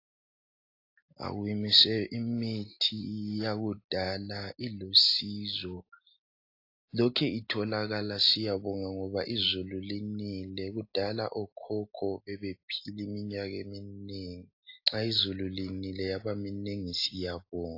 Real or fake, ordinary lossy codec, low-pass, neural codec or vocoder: real; AAC, 32 kbps; 5.4 kHz; none